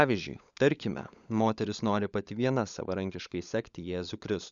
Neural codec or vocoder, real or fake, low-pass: codec, 16 kHz, 16 kbps, FunCodec, trained on LibriTTS, 50 frames a second; fake; 7.2 kHz